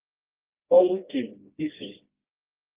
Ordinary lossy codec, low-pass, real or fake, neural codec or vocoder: Opus, 24 kbps; 3.6 kHz; fake; codec, 16 kHz, 1 kbps, FreqCodec, smaller model